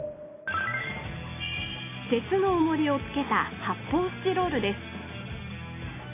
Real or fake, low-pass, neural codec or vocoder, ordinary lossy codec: real; 3.6 kHz; none; AAC, 16 kbps